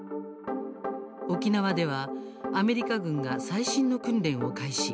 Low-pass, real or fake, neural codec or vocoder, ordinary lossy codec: none; real; none; none